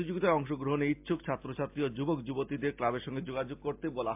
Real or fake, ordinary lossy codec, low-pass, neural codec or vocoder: real; MP3, 32 kbps; 3.6 kHz; none